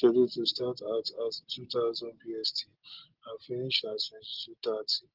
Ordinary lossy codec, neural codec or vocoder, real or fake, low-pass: Opus, 16 kbps; none; real; 5.4 kHz